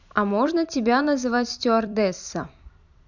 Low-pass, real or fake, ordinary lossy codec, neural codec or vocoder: 7.2 kHz; fake; none; vocoder, 44.1 kHz, 128 mel bands every 256 samples, BigVGAN v2